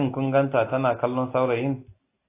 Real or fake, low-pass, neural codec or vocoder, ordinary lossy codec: real; 3.6 kHz; none; AAC, 24 kbps